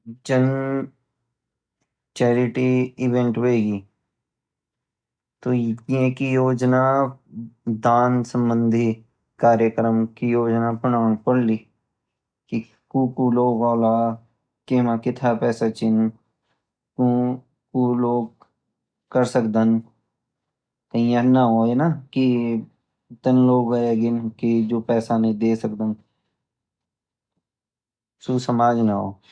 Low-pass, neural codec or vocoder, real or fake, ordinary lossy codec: 9.9 kHz; none; real; none